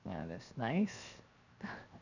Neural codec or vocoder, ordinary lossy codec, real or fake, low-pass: codec, 16 kHz, 0.7 kbps, FocalCodec; none; fake; 7.2 kHz